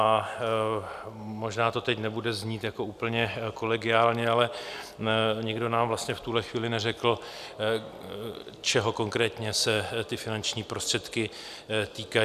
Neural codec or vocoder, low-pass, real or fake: none; 14.4 kHz; real